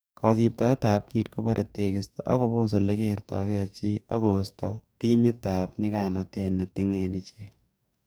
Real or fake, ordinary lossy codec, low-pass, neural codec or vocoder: fake; none; none; codec, 44.1 kHz, 2.6 kbps, DAC